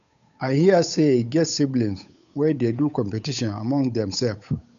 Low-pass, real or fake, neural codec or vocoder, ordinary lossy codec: 7.2 kHz; fake; codec, 16 kHz, 8 kbps, FunCodec, trained on Chinese and English, 25 frames a second; none